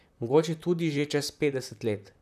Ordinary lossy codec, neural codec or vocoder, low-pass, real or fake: none; vocoder, 44.1 kHz, 128 mel bands, Pupu-Vocoder; 14.4 kHz; fake